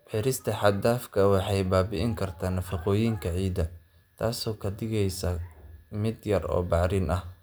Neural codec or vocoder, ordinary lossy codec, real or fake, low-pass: none; none; real; none